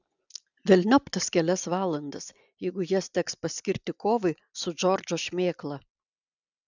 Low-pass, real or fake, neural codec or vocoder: 7.2 kHz; real; none